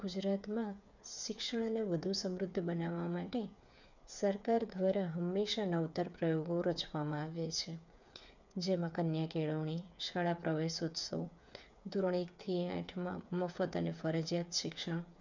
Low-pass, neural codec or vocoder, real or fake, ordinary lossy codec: 7.2 kHz; codec, 16 kHz, 8 kbps, FreqCodec, smaller model; fake; none